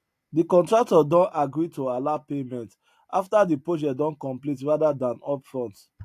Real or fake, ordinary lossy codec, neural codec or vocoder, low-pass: real; AAC, 64 kbps; none; 14.4 kHz